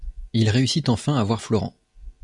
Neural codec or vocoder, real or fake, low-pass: none; real; 10.8 kHz